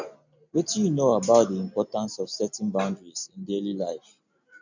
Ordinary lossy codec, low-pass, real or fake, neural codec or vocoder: none; 7.2 kHz; real; none